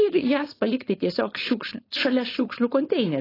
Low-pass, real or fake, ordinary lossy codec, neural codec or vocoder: 5.4 kHz; fake; AAC, 24 kbps; codec, 16 kHz, 4.8 kbps, FACodec